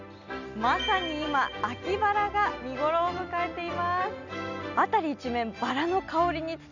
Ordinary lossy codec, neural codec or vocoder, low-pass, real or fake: none; none; 7.2 kHz; real